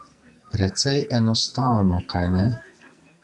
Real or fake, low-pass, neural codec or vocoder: fake; 10.8 kHz; codec, 44.1 kHz, 2.6 kbps, SNAC